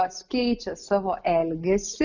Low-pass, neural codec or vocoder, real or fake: 7.2 kHz; none; real